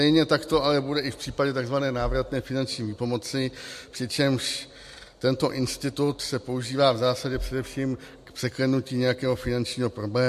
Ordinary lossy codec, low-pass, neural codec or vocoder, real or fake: MP3, 64 kbps; 14.4 kHz; none; real